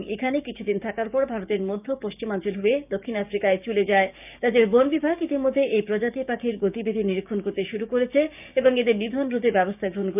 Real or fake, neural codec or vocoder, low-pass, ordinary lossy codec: fake; codec, 16 kHz, 6 kbps, DAC; 3.6 kHz; none